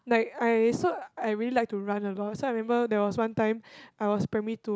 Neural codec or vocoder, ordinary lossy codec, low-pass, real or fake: none; none; none; real